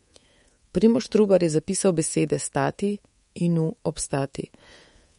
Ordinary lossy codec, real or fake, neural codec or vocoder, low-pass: MP3, 48 kbps; fake; codec, 24 kHz, 3.1 kbps, DualCodec; 10.8 kHz